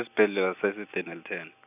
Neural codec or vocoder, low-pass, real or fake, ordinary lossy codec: none; 3.6 kHz; real; none